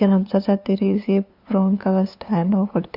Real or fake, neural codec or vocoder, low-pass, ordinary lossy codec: fake; codec, 16 kHz, 2 kbps, FunCodec, trained on LibriTTS, 25 frames a second; 5.4 kHz; none